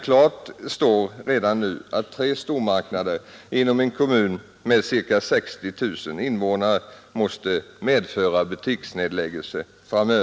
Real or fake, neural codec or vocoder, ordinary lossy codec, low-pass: real; none; none; none